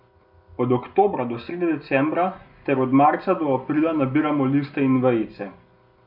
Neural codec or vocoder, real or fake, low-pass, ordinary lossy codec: none; real; 5.4 kHz; none